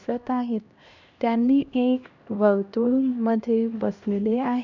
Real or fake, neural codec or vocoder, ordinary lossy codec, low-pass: fake; codec, 16 kHz, 1 kbps, X-Codec, HuBERT features, trained on LibriSpeech; none; 7.2 kHz